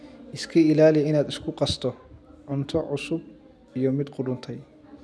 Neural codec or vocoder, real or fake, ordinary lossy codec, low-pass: none; real; none; none